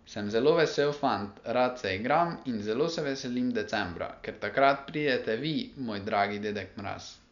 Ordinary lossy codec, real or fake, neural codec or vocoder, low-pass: MP3, 96 kbps; real; none; 7.2 kHz